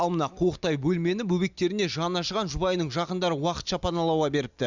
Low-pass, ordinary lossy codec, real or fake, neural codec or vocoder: 7.2 kHz; Opus, 64 kbps; real; none